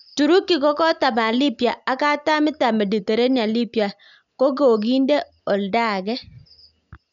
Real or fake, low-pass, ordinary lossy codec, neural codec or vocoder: real; 7.2 kHz; none; none